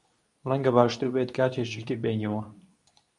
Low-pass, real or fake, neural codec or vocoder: 10.8 kHz; fake; codec, 24 kHz, 0.9 kbps, WavTokenizer, medium speech release version 2